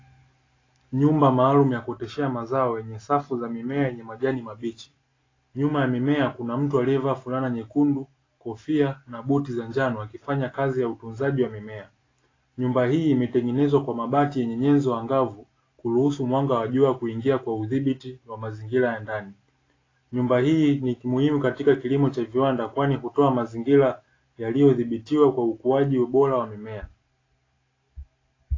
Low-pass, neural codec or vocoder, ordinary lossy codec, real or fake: 7.2 kHz; none; AAC, 32 kbps; real